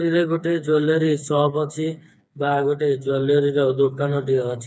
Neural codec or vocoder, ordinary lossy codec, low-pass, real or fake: codec, 16 kHz, 4 kbps, FreqCodec, smaller model; none; none; fake